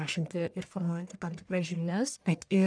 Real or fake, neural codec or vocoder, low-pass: fake; codec, 44.1 kHz, 1.7 kbps, Pupu-Codec; 9.9 kHz